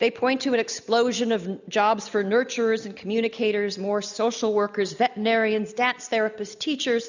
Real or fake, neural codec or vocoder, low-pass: real; none; 7.2 kHz